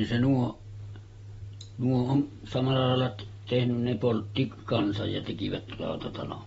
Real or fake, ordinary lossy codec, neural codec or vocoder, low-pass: real; AAC, 24 kbps; none; 19.8 kHz